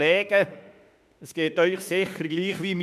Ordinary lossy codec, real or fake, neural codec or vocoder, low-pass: none; fake; autoencoder, 48 kHz, 32 numbers a frame, DAC-VAE, trained on Japanese speech; 14.4 kHz